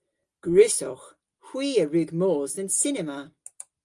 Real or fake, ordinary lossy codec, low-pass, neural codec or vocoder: real; Opus, 32 kbps; 10.8 kHz; none